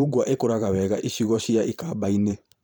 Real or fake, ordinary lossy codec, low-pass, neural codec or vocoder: fake; none; none; vocoder, 44.1 kHz, 128 mel bands every 512 samples, BigVGAN v2